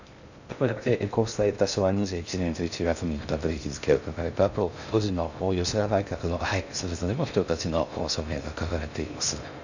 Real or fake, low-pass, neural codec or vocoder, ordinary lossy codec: fake; 7.2 kHz; codec, 16 kHz in and 24 kHz out, 0.6 kbps, FocalCodec, streaming, 2048 codes; none